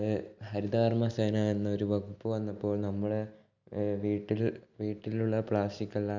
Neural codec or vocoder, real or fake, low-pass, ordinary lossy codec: none; real; 7.2 kHz; none